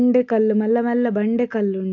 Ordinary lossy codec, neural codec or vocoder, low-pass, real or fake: AAC, 48 kbps; none; 7.2 kHz; real